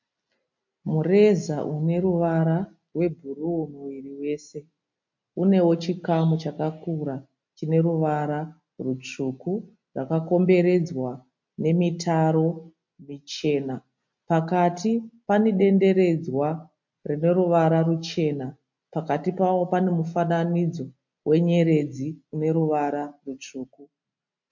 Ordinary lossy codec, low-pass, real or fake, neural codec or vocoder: MP3, 48 kbps; 7.2 kHz; real; none